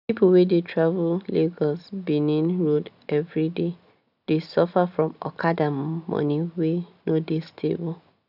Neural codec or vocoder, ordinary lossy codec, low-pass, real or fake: none; none; 5.4 kHz; real